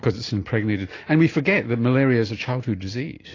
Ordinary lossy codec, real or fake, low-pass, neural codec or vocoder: AAC, 32 kbps; real; 7.2 kHz; none